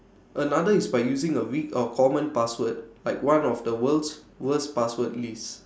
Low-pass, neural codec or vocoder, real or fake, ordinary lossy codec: none; none; real; none